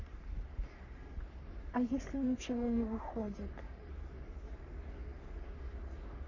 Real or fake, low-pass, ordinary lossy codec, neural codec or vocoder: fake; 7.2 kHz; none; codec, 44.1 kHz, 3.4 kbps, Pupu-Codec